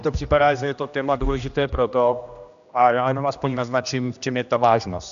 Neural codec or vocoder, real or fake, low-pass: codec, 16 kHz, 1 kbps, X-Codec, HuBERT features, trained on general audio; fake; 7.2 kHz